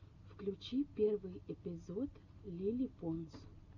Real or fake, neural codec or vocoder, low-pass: real; none; 7.2 kHz